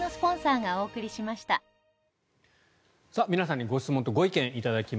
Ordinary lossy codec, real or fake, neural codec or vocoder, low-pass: none; real; none; none